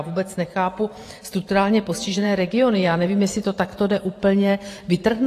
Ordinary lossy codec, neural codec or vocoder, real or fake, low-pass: AAC, 48 kbps; vocoder, 44.1 kHz, 128 mel bands every 512 samples, BigVGAN v2; fake; 14.4 kHz